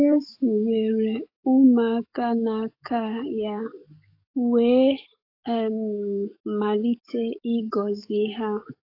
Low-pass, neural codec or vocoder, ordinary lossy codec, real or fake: 5.4 kHz; codec, 44.1 kHz, 7.8 kbps, DAC; AAC, 32 kbps; fake